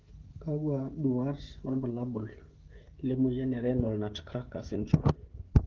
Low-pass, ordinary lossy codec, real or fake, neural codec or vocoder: 7.2 kHz; Opus, 16 kbps; fake; codec, 16 kHz, 16 kbps, FreqCodec, smaller model